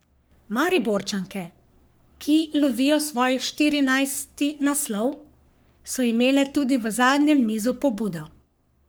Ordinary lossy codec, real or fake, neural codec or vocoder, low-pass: none; fake; codec, 44.1 kHz, 3.4 kbps, Pupu-Codec; none